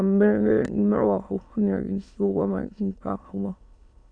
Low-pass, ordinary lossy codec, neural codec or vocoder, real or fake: 9.9 kHz; MP3, 96 kbps; autoencoder, 22.05 kHz, a latent of 192 numbers a frame, VITS, trained on many speakers; fake